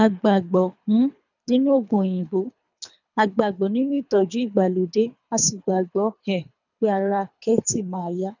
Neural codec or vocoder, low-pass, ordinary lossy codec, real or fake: codec, 24 kHz, 3 kbps, HILCodec; 7.2 kHz; none; fake